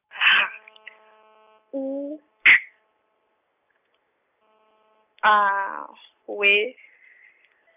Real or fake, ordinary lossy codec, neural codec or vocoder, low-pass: real; none; none; 3.6 kHz